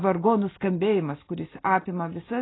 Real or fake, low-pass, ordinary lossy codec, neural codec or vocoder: real; 7.2 kHz; AAC, 16 kbps; none